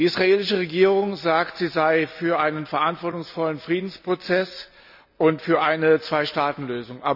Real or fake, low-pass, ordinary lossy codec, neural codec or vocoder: real; 5.4 kHz; none; none